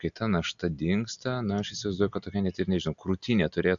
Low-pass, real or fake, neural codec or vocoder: 7.2 kHz; real; none